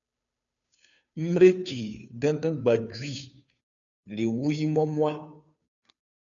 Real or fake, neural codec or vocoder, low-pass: fake; codec, 16 kHz, 2 kbps, FunCodec, trained on Chinese and English, 25 frames a second; 7.2 kHz